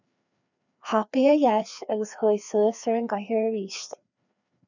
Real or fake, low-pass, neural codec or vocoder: fake; 7.2 kHz; codec, 16 kHz, 2 kbps, FreqCodec, larger model